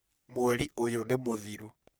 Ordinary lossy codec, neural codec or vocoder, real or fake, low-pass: none; codec, 44.1 kHz, 3.4 kbps, Pupu-Codec; fake; none